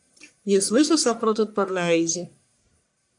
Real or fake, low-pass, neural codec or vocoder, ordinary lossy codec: fake; 10.8 kHz; codec, 44.1 kHz, 1.7 kbps, Pupu-Codec; AAC, 64 kbps